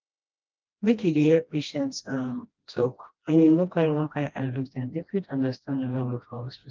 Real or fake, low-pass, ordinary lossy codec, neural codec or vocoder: fake; 7.2 kHz; Opus, 24 kbps; codec, 16 kHz, 1 kbps, FreqCodec, smaller model